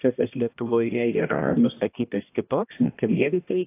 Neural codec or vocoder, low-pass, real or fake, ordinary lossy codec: codec, 16 kHz, 1 kbps, X-Codec, HuBERT features, trained on balanced general audio; 3.6 kHz; fake; AAC, 24 kbps